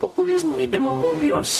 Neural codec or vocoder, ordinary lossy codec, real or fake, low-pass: codec, 44.1 kHz, 0.9 kbps, DAC; MP3, 64 kbps; fake; 14.4 kHz